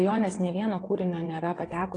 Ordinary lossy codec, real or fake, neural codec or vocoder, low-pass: AAC, 32 kbps; fake; vocoder, 22.05 kHz, 80 mel bands, WaveNeXt; 9.9 kHz